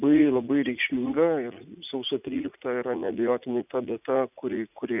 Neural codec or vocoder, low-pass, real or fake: vocoder, 44.1 kHz, 80 mel bands, Vocos; 3.6 kHz; fake